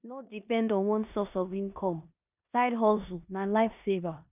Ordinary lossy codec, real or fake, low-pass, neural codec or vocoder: none; fake; 3.6 kHz; codec, 16 kHz in and 24 kHz out, 0.9 kbps, LongCat-Audio-Codec, four codebook decoder